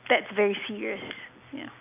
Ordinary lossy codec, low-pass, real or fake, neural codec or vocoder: AAC, 32 kbps; 3.6 kHz; real; none